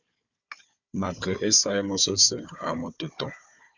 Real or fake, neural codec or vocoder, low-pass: fake; codec, 16 kHz, 4 kbps, FunCodec, trained on Chinese and English, 50 frames a second; 7.2 kHz